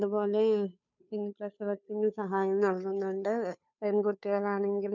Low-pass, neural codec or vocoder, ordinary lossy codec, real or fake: 7.2 kHz; codec, 16 kHz, 2 kbps, FunCodec, trained on Chinese and English, 25 frames a second; none; fake